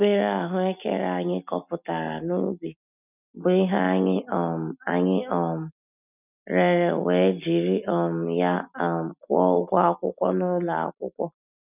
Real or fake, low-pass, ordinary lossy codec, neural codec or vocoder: real; 3.6 kHz; none; none